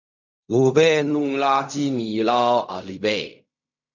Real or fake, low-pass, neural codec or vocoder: fake; 7.2 kHz; codec, 16 kHz in and 24 kHz out, 0.4 kbps, LongCat-Audio-Codec, fine tuned four codebook decoder